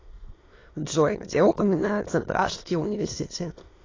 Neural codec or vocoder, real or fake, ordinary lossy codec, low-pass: autoencoder, 22.05 kHz, a latent of 192 numbers a frame, VITS, trained on many speakers; fake; AAC, 32 kbps; 7.2 kHz